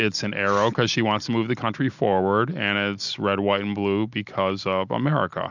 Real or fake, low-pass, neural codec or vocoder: real; 7.2 kHz; none